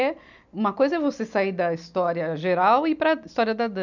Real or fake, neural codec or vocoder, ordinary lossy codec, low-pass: real; none; none; 7.2 kHz